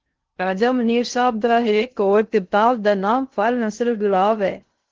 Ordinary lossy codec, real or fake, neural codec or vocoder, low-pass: Opus, 16 kbps; fake; codec, 16 kHz in and 24 kHz out, 0.6 kbps, FocalCodec, streaming, 2048 codes; 7.2 kHz